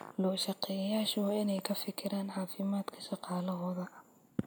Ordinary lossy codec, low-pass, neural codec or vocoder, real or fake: none; none; none; real